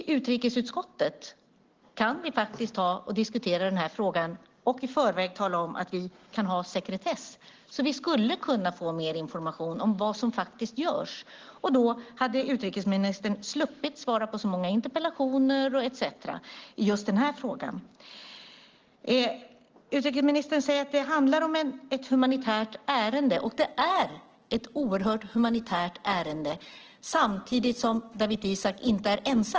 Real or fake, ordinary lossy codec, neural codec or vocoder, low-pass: real; Opus, 16 kbps; none; 7.2 kHz